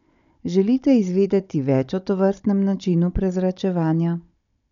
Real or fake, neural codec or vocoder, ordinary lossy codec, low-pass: fake; codec, 16 kHz, 16 kbps, FunCodec, trained on Chinese and English, 50 frames a second; none; 7.2 kHz